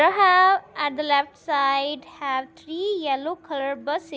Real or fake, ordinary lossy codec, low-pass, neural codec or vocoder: real; none; none; none